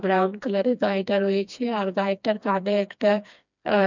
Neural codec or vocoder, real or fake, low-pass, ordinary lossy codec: codec, 16 kHz, 2 kbps, FreqCodec, smaller model; fake; 7.2 kHz; none